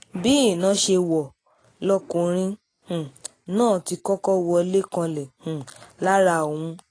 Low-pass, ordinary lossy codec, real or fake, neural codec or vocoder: 9.9 kHz; AAC, 32 kbps; real; none